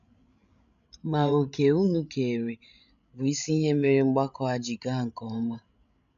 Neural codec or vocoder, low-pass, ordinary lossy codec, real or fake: codec, 16 kHz, 16 kbps, FreqCodec, larger model; 7.2 kHz; none; fake